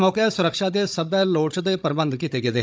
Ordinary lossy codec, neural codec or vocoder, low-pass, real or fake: none; codec, 16 kHz, 16 kbps, FunCodec, trained on Chinese and English, 50 frames a second; none; fake